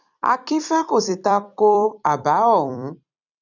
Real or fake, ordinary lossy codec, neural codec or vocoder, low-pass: fake; none; vocoder, 44.1 kHz, 128 mel bands every 256 samples, BigVGAN v2; 7.2 kHz